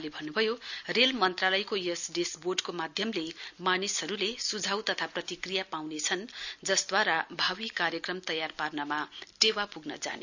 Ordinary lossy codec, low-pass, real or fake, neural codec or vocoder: none; 7.2 kHz; real; none